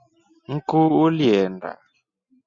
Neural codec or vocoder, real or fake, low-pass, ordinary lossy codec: none; real; 7.2 kHz; Opus, 64 kbps